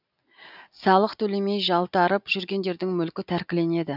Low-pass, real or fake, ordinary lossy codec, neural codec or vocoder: 5.4 kHz; real; none; none